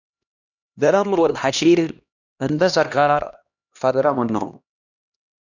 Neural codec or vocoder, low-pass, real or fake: codec, 16 kHz, 1 kbps, X-Codec, HuBERT features, trained on LibriSpeech; 7.2 kHz; fake